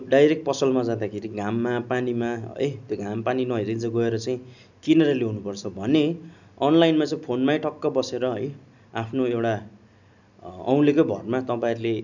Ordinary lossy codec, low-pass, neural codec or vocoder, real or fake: none; 7.2 kHz; none; real